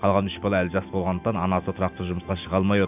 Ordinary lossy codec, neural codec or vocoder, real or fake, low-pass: none; none; real; 3.6 kHz